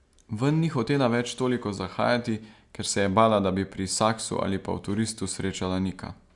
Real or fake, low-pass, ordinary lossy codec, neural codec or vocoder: real; 10.8 kHz; Opus, 64 kbps; none